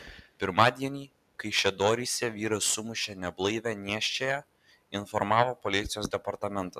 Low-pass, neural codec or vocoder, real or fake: 14.4 kHz; none; real